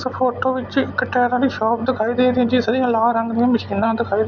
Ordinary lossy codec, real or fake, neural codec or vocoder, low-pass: none; real; none; none